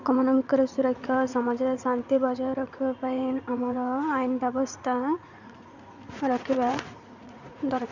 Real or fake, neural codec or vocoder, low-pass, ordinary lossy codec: fake; vocoder, 44.1 kHz, 80 mel bands, Vocos; 7.2 kHz; none